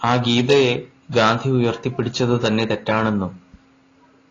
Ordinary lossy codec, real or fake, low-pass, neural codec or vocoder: AAC, 32 kbps; real; 7.2 kHz; none